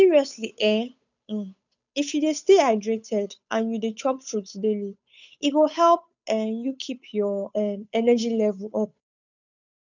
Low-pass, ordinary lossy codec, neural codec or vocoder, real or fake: 7.2 kHz; none; codec, 16 kHz, 8 kbps, FunCodec, trained on Chinese and English, 25 frames a second; fake